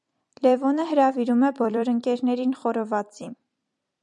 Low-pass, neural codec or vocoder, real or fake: 10.8 kHz; vocoder, 44.1 kHz, 128 mel bands every 256 samples, BigVGAN v2; fake